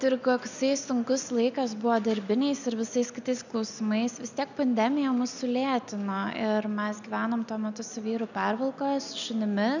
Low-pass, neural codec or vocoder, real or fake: 7.2 kHz; none; real